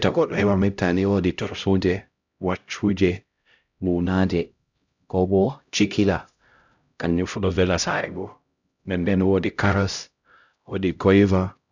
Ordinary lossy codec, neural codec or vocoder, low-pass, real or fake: none; codec, 16 kHz, 0.5 kbps, X-Codec, HuBERT features, trained on LibriSpeech; 7.2 kHz; fake